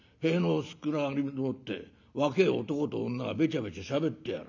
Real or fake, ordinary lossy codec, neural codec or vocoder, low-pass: real; none; none; 7.2 kHz